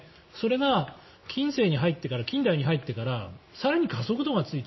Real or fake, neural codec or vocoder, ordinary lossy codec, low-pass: real; none; MP3, 24 kbps; 7.2 kHz